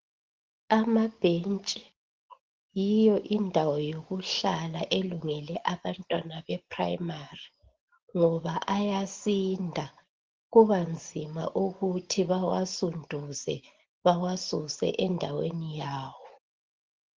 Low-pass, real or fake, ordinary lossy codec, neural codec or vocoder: 7.2 kHz; real; Opus, 16 kbps; none